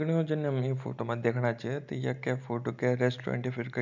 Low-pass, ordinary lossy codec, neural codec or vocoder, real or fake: 7.2 kHz; none; none; real